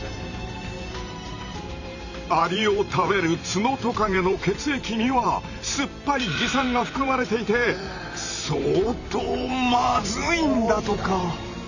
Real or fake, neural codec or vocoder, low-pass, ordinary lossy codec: fake; vocoder, 44.1 kHz, 128 mel bands every 512 samples, BigVGAN v2; 7.2 kHz; none